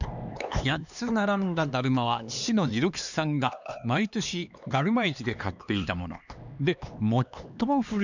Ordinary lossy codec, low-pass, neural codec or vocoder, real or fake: none; 7.2 kHz; codec, 16 kHz, 2 kbps, X-Codec, HuBERT features, trained on LibriSpeech; fake